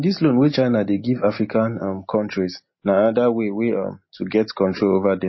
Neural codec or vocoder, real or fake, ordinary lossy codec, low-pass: none; real; MP3, 24 kbps; 7.2 kHz